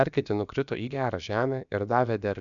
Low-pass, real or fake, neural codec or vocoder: 7.2 kHz; fake; codec, 16 kHz, about 1 kbps, DyCAST, with the encoder's durations